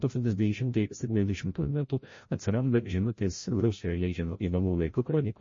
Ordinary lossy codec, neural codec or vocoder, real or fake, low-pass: MP3, 32 kbps; codec, 16 kHz, 0.5 kbps, FreqCodec, larger model; fake; 7.2 kHz